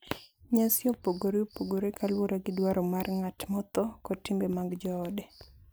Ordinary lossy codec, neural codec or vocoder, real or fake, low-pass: none; none; real; none